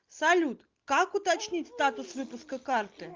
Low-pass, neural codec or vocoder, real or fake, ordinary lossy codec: 7.2 kHz; none; real; Opus, 32 kbps